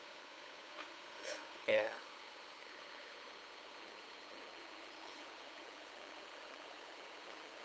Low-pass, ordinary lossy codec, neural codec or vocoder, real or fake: none; none; codec, 16 kHz, 8 kbps, FunCodec, trained on LibriTTS, 25 frames a second; fake